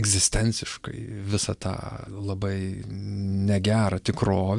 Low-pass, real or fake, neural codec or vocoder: 10.8 kHz; real; none